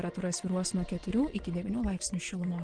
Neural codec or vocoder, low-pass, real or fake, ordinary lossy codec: vocoder, 24 kHz, 100 mel bands, Vocos; 9.9 kHz; fake; Opus, 16 kbps